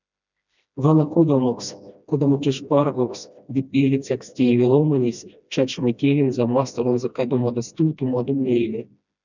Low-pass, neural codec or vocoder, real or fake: 7.2 kHz; codec, 16 kHz, 1 kbps, FreqCodec, smaller model; fake